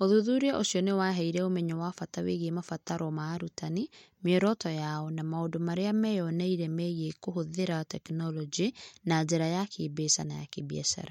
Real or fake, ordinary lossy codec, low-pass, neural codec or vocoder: real; MP3, 64 kbps; 19.8 kHz; none